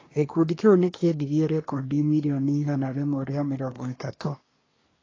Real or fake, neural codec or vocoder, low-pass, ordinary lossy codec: fake; codec, 24 kHz, 1 kbps, SNAC; 7.2 kHz; AAC, 32 kbps